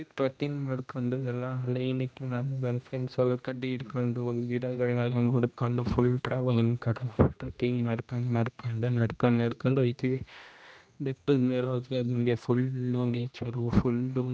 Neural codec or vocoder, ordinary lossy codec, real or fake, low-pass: codec, 16 kHz, 1 kbps, X-Codec, HuBERT features, trained on general audio; none; fake; none